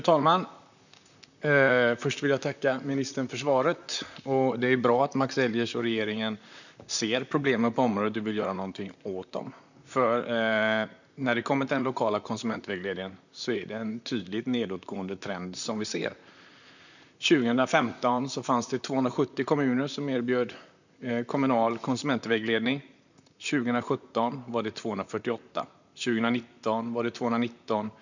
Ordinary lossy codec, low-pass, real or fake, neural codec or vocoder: none; 7.2 kHz; fake; vocoder, 44.1 kHz, 128 mel bands, Pupu-Vocoder